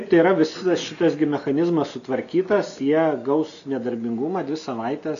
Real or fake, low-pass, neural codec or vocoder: real; 7.2 kHz; none